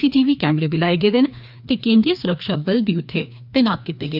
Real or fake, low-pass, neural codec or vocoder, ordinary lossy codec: fake; 5.4 kHz; codec, 16 kHz, 2 kbps, FreqCodec, larger model; none